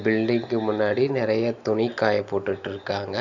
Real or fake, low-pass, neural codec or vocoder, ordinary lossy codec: real; 7.2 kHz; none; none